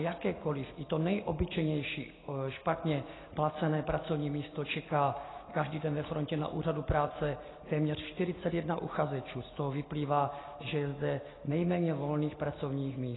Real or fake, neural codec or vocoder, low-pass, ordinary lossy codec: real; none; 7.2 kHz; AAC, 16 kbps